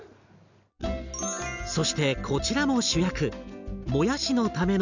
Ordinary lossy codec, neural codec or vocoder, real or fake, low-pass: none; none; real; 7.2 kHz